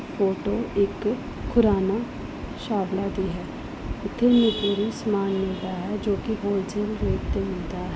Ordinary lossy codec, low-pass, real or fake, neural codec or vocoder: none; none; real; none